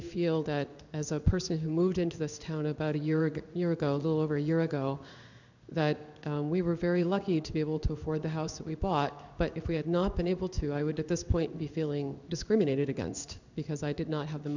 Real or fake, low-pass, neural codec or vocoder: fake; 7.2 kHz; codec, 16 kHz in and 24 kHz out, 1 kbps, XY-Tokenizer